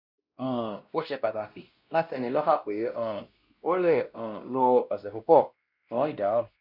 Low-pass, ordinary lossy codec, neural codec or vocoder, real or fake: 5.4 kHz; Opus, 64 kbps; codec, 16 kHz, 1 kbps, X-Codec, WavLM features, trained on Multilingual LibriSpeech; fake